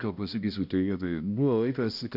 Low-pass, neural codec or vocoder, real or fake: 5.4 kHz; codec, 16 kHz, 1 kbps, X-Codec, HuBERT features, trained on balanced general audio; fake